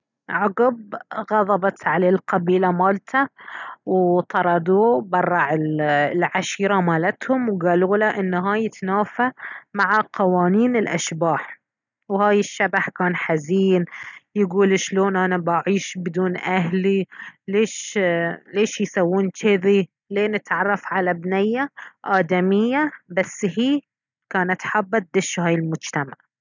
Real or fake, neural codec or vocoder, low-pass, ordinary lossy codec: real; none; 7.2 kHz; none